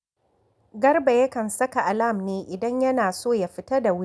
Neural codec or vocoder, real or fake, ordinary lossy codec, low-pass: none; real; none; none